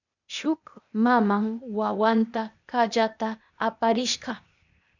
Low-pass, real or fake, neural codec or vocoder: 7.2 kHz; fake; codec, 16 kHz, 0.8 kbps, ZipCodec